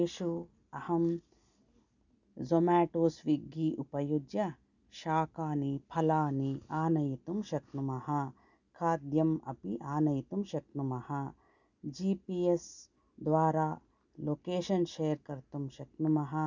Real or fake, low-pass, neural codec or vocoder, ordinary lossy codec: real; 7.2 kHz; none; none